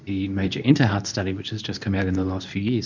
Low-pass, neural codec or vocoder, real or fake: 7.2 kHz; codec, 24 kHz, 0.9 kbps, WavTokenizer, medium speech release version 2; fake